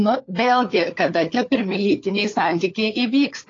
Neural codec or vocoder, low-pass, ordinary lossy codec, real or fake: codec, 16 kHz, 4 kbps, FunCodec, trained on LibriTTS, 50 frames a second; 7.2 kHz; AAC, 32 kbps; fake